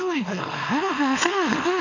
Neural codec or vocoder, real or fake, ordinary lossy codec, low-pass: codec, 24 kHz, 0.9 kbps, WavTokenizer, small release; fake; none; 7.2 kHz